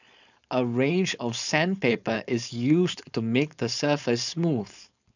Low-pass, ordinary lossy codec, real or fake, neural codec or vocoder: 7.2 kHz; none; fake; codec, 16 kHz, 4.8 kbps, FACodec